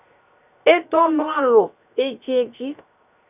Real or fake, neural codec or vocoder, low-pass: fake; codec, 16 kHz, 0.7 kbps, FocalCodec; 3.6 kHz